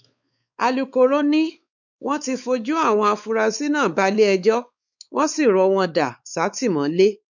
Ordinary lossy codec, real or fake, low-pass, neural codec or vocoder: none; fake; 7.2 kHz; codec, 16 kHz, 4 kbps, X-Codec, WavLM features, trained on Multilingual LibriSpeech